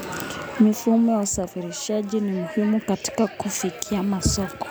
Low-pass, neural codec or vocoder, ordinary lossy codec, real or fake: none; none; none; real